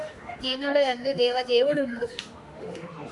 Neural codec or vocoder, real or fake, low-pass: autoencoder, 48 kHz, 32 numbers a frame, DAC-VAE, trained on Japanese speech; fake; 10.8 kHz